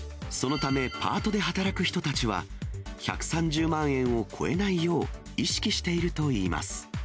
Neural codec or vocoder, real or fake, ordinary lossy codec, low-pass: none; real; none; none